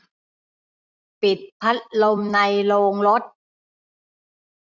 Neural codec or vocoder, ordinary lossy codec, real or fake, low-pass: vocoder, 44.1 kHz, 128 mel bands every 256 samples, BigVGAN v2; none; fake; 7.2 kHz